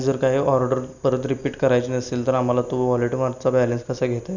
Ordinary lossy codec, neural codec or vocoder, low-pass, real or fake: none; none; 7.2 kHz; real